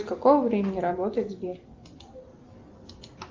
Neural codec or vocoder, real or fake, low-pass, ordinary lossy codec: none; real; 7.2 kHz; Opus, 24 kbps